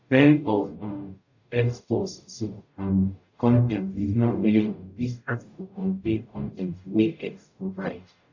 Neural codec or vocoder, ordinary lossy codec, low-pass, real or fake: codec, 44.1 kHz, 0.9 kbps, DAC; none; 7.2 kHz; fake